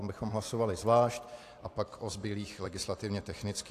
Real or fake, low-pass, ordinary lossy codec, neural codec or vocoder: fake; 14.4 kHz; AAC, 64 kbps; vocoder, 44.1 kHz, 128 mel bands every 256 samples, BigVGAN v2